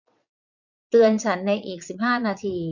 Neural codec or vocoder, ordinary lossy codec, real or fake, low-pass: vocoder, 22.05 kHz, 80 mel bands, Vocos; none; fake; 7.2 kHz